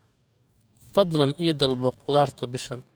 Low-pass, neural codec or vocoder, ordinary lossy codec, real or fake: none; codec, 44.1 kHz, 2.6 kbps, DAC; none; fake